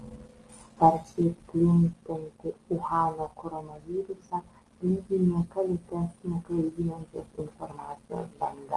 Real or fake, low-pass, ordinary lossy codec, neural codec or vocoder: real; 10.8 kHz; Opus, 24 kbps; none